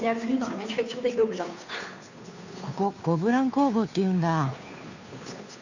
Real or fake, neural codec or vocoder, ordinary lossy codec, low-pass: fake; codec, 16 kHz, 2 kbps, FunCodec, trained on Chinese and English, 25 frames a second; none; 7.2 kHz